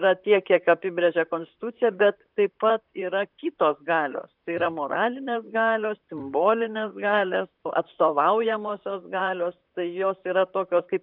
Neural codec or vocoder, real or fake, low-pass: vocoder, 44.1 kHz, 128 mel bands, Pupu-Vocoder; fake; 5.4 kHz